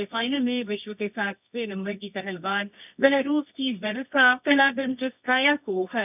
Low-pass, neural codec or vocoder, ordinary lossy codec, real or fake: 3.6 kHz; codec, 24 kHz, 0.9 kbps, WavTokenizer, medium music audio release; none; fake